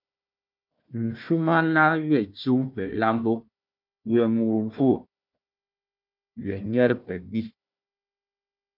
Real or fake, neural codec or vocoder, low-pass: fake; codec, 16 kHz, 1 kbps, FunCodec, trained on Chinese and English, 50 frames a second; 5.4 kHz